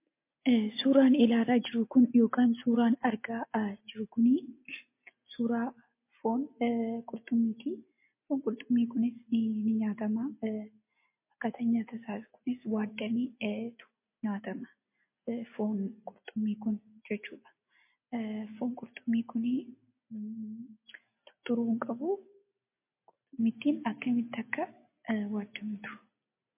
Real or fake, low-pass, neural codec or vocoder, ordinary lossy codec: real; 3.6 kHz; none; MP3, 24 kbps